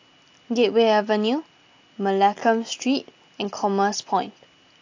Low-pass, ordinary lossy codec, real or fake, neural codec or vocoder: 7.2 kHz; AAC, 48 kbps; real; none